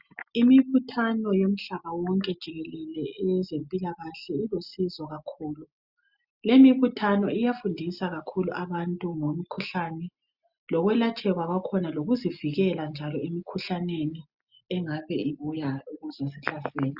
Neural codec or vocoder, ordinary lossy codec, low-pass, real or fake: none; Opus, 64 kbps; 5.4 kHz; real